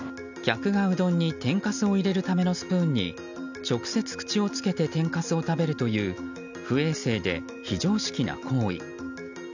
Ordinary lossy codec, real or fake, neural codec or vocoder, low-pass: none; real; none; 7.2 kHz